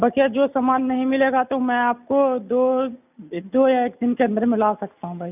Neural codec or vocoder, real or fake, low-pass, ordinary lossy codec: none; real; 3.6 kHz; none